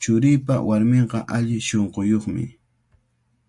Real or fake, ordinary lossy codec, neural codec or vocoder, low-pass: real; MP3, 64 kbps; none; 10.8 kHz